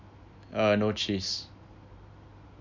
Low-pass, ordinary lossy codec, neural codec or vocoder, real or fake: 7.2 kHz; none; none; real